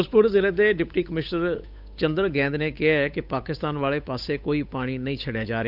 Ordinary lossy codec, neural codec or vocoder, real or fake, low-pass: none; codec, 16 kHz, 8 kbps, FunCodec, trained on Chinese and English, 25 frames a second; fake; 5.4 kHz